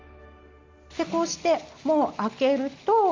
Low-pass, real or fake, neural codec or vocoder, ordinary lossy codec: 7.2 kHz; real; none; Opus, 32 kbps